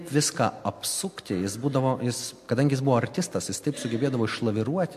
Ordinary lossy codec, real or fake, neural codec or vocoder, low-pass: MP3, 64 kbps; real; none; 14.4 kHz